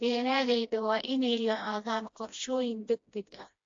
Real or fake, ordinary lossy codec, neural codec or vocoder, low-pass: fake; AAC, 48 kbps; codec, 16 kHz, 1 kbps, FreqCodec, smaller model; 7.2 kHz